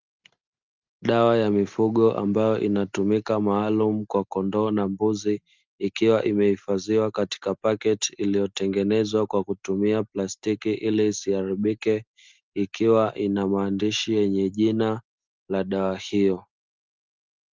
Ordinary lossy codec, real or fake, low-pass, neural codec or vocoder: Opus, 24 kbps; real; 7.2 kHz; none